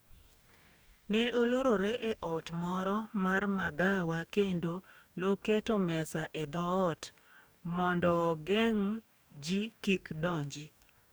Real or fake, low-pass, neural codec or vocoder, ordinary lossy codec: fake; none; codec, 44.1 kHz, 2.6 kbps, DAC; none